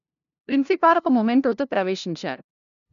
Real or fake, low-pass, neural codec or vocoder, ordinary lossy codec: fake; 7.2 kHz; codec, 16 kHz, 0.5 kbps, FunCodec, trained on LibriTTS, 25 frames a second; none